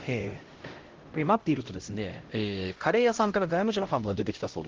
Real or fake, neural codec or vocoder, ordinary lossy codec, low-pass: fake; codec, 16 kHz, 0.5 kbps, X-Codec, HuBERT features, trained on LibriSpeech; Opus, 16 kbps; 7.2 kHz